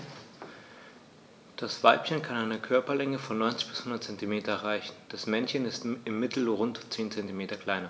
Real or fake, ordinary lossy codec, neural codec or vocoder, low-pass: real; none; none; none